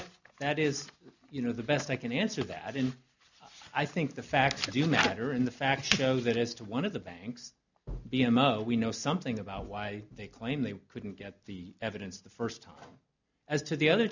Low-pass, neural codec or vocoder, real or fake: 7.2 kHz; none; real